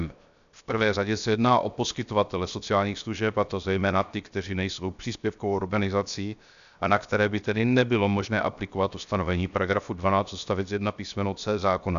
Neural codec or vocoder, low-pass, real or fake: codec, 16 kHz, 0.7 kbps, FocalCodec; 7.2 kHz; fake